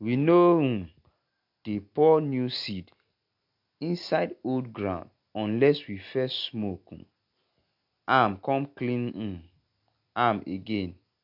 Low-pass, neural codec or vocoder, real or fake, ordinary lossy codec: 5.4 kHz; none; real; MP3, 48 kbps